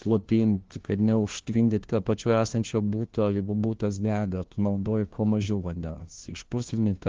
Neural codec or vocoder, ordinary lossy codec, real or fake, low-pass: codec, 16 kHz, 1 kbps, FunCodec, trained on LibriTTS, 50 frames a second; Opus, 16 kbps; fake; 7.2 kHz